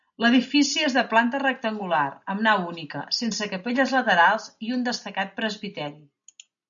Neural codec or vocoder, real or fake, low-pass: none; real; 7.2 kHz